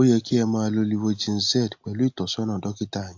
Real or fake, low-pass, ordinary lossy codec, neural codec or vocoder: real; 7.2 kHz; AAC, 48 kbps; none